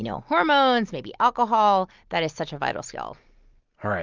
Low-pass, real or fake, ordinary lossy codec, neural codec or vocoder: 7.2 kHz; real; Opus, 24 kbps; none